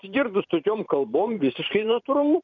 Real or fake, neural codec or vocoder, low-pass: fake; vocoder, 44.1 kHz, 128 mel bands every 512 samples, BigVGAN v2; 7.2 kHz